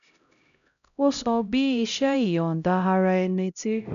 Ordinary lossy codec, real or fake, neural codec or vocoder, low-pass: none; fake; codec, 16 kHz, 0.5 kbps, X-Codec, HuBERT features, trained on LibriSpeech; 7.2 kHz